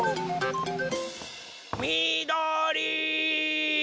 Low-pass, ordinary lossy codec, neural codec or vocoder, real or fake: none; none; none; real